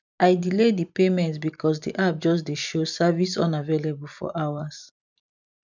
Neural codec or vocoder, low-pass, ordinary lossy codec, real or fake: vocoder, 44.1 kHz, 128 mel bands every 256 samples, BigVGAN v2; 7.2 kHz; none; fake